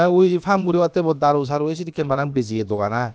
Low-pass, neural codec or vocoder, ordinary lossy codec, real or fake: none; codec, 16 kHz, about 1 kbps, DyCAST, with the encoder's durations; none; fake